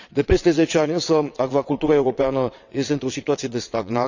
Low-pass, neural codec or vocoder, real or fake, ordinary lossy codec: 7.2 kHz; vocoder, 22.05 kHz, 80 mel bands, WaveNeXt; fake; none